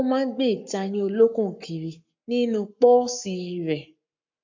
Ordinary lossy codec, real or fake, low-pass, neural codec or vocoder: MP3, 48 kbps; fake; 7.2 kHz; codec, 44.1 kHz, 7.8 kbps, Pupu-Codec